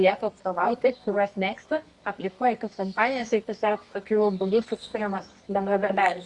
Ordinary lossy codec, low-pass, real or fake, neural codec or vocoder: Opus, 64 kbps; 10.8 kHz; fake; codec, 24 kHz, 0.9 kbps, WavTokenizer, medium music audio release